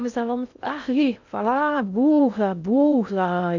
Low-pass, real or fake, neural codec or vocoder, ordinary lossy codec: 7.2 kHz; fake; codec, 16 kHz in and 24 kHz out, 0.6 kbps, FocalCodec, streaming, 2048 codes; none